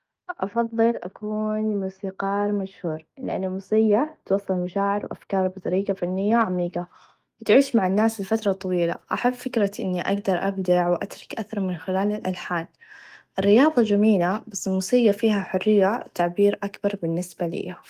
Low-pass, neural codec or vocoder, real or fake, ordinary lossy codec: 14.4 kHz; autoencoder, 48 kHz, 128 numbers a frame, DAC-VAE, trained on Japanese speech; fake; Opus, 24 kbps